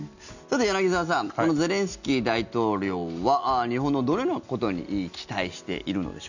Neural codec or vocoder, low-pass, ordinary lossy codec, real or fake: none; 7.2 kHz; none; real